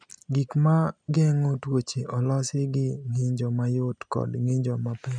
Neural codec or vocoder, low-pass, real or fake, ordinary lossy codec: none; 9.9 kHz; real; none